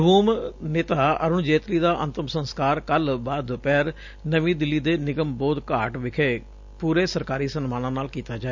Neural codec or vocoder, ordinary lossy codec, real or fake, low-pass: none; none; real; 7.2 kHz